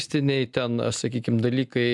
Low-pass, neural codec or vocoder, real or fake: 10.8 kHz; none; real